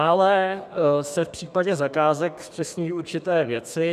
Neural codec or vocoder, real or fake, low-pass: codec, 32 kHz, 1.9 kbps, SNAC; fake; 14.4 kHz